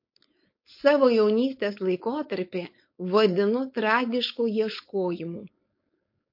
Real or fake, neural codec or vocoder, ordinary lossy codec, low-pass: fake; codec, 16 kHz, 4.8 kbps, FACodec; MP3, 32 kbps; 5.4 kHz